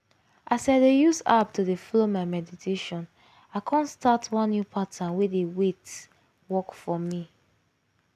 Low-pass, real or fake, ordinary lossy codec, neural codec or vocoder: 14.4 kHz; real; none; none